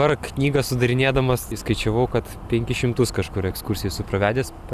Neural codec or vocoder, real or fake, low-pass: vocoder, 44.1 kHz, 128 mel bands every 256 samples, BigVGAN v2; fake; 14.4 kHz